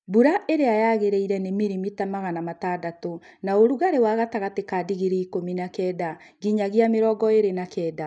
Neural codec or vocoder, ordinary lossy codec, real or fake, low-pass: none; none; real; 9.9 kHz